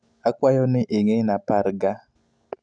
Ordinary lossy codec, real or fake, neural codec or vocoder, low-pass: none; real; none; 9.9 kHz